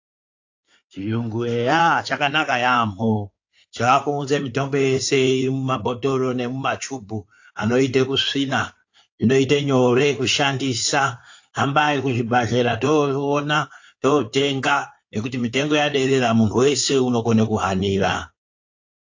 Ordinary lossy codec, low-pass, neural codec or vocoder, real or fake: AAC, 48 kbps; 7.2 kHz; codec, 16 kHz in and 24 kHz out, 2.2 kbps, FireRedTTS-2 codec; fake